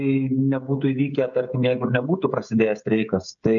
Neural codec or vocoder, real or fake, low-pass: codec, 16 kHz, 16 kbps, FreqCodec, smaller model; fake; 7.2 kHz